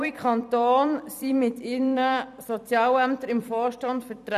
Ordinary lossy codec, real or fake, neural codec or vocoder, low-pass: none; fake; vocoder, 44.1 kHz, 128 mel bands every 256 samples, BigVGAN v2; 14.4 kHz